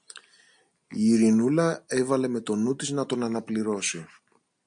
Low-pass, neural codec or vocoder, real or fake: 9.9 kHz; none; real